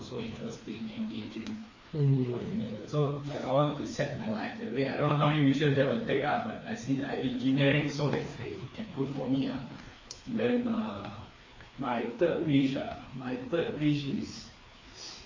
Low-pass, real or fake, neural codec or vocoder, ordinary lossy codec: 7.2 kHz; fake; codec, 16 kHz, 2 kbps, FreqCodec, larger model; MP3, 32 kbps